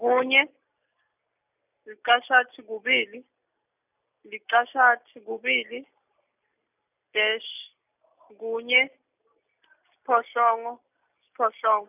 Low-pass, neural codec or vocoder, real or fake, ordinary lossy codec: 3.6 kHz; none; real; none